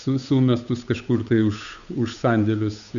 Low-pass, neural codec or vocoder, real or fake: 7.2 kHz; codec, 16 kHz, 8 kbps, FunCodec, trained on Chinese and English, 25 frames a second; fake